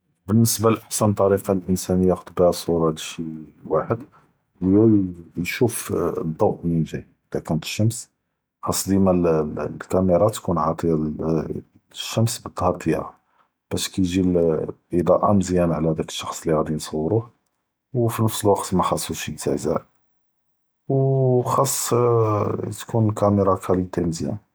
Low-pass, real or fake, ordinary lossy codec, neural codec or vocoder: none; real; none; none